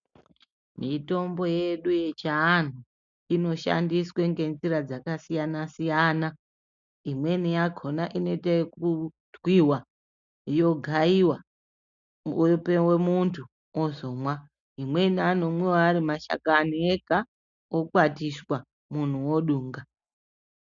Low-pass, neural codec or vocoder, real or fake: 7.2 kHz; none; real